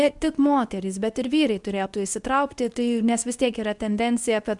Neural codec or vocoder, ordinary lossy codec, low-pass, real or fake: codec, 24 kHz, 0.9 kbps, WavTokenizer, medium speech release version 2; Opus, 64 kbps; 10.8 kHz; fake